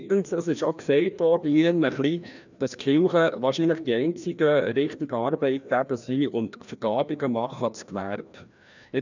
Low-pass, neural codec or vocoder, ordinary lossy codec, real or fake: 7.2 kHz; codec, 16 kHz, 1 kbps, FreqCodec, larger model; none; fake